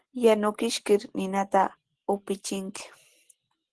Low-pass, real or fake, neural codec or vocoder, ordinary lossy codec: 10.8 kHz; real; none; Opus, 16 kbps